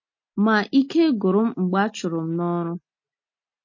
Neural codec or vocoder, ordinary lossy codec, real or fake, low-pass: none; MP3, 32 kbps; real; 7.2 kHz